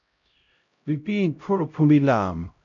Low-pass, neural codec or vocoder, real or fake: 7.2 kHz; codec, 16 kHz, 0.5 kbps, X-Codec, HuBERT features, trained on LibriSpeech; fake